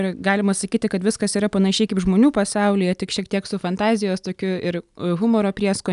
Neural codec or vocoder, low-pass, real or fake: none; 10.8 kHz; real